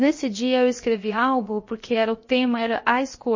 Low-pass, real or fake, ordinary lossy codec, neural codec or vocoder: 7.2 kHz; fake; MP3, 32 kbps; codec, 16 kHz, 0.7 kbps, FocalCodec